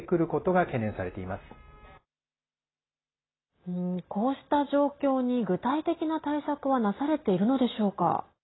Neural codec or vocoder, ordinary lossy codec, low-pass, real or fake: none; AAC, 16 kbps; 7.2 kHz; real